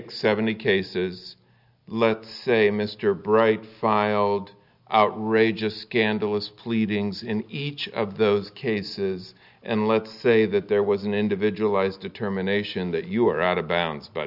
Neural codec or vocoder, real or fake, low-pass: none; real; 5.4 kHz